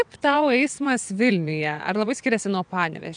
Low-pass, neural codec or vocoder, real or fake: 9.9 kHz; vocoder, 22.05 kHz, 80 mel bands, WaveNeXt; fake